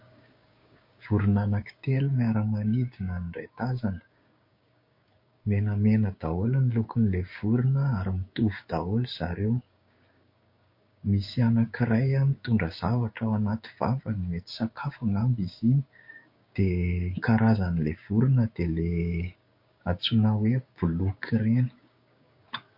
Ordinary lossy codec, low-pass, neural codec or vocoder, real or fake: MP3, 32 kbps; 5.4 kHz; codec, 16 kHz, 6 kbps, DAC; fake